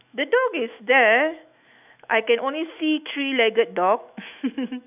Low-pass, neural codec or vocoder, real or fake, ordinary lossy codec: 3.6 kHz; autoencoder, 48 kHz, 128 numbers a frame, DAC-VAE, trained on Japanese speech; fake; none